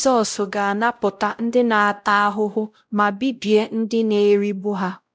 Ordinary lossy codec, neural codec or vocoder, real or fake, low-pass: none; codec, 16 kHz, 0.5 kbps, X-Codec, WavLM features, trained on Multilingual LibriSpeech; fake; none